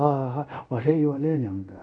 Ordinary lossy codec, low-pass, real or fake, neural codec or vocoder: none; 9.9 kHz; fake; codec, 24 kHz, 0.5 kbps, DualCodec